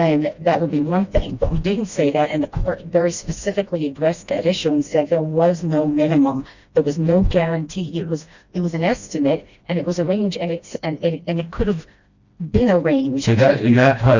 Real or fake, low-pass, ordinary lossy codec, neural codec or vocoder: fake; 7.2 kHz; Opus, 64 kbps; codec, 16 kHz, 1 kbps, FreqCodec, smaller model